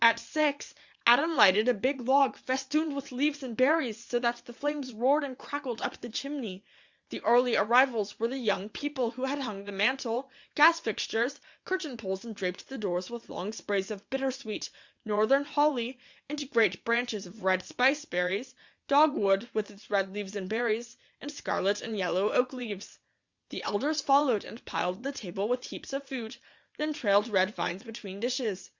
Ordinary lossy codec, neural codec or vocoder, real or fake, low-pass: Opus, 64 kbps; vocoder, 22.05 kHz, 80 mel bands, WaveNeXt; fake; 7.2 kHz